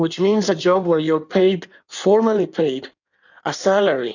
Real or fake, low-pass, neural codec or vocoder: fake; 7.2 kHz; codec, 16 kHz in and 24 kHz out, 1.1 kbps, FireRedTTS-2 codec